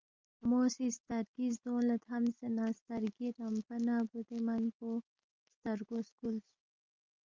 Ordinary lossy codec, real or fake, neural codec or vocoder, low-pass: Opus, 32 kbps; real; none; 7.2 kHz